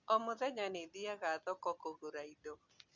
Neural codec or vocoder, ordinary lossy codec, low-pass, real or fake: none; Opus, 64 kbps; 7.2 kHz; real